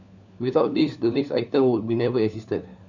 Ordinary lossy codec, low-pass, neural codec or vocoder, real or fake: none; 7.2 kHz; codec, 16 kHz, 4 kbps, FunCodec, trained on LibriTTS, 50 frames a second; fake